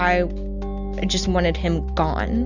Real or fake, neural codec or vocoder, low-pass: real; none; 7.2 kHz